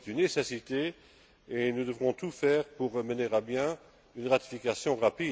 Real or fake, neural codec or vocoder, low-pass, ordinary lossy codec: real; none; none; none